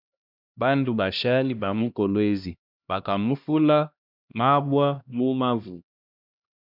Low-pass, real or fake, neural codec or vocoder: 5.4 kHz; fake; codec, 16 kHz, 1 kbps, X-Codec, HuBERT features, trained on LibriSpeech